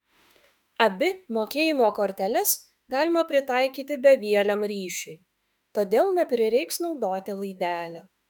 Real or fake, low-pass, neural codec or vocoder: fake; 19.8 kHz; autoencoder, 48 kHz, 32 numbers a frame, DAC-VAE, trained on Japanese speech